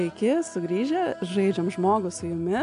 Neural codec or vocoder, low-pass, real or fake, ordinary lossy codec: none; 10.8 kHz; real; AAC, 64 kbps